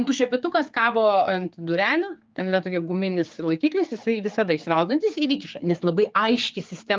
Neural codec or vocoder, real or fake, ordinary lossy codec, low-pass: codec, 16 kHz, 4 kbps, X-Codec, HuBERT features, trained on general audio; fake; Opus, 24 kbps; 7.2 kHz